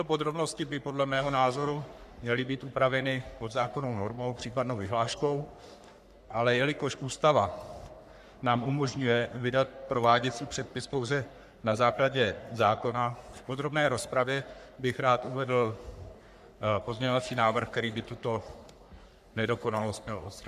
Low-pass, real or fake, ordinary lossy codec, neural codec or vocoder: 14.4 kHz; fake; MP3, 96 kbps; codec, 44.1 kHz, 3.4 kbps, Pupu-Codec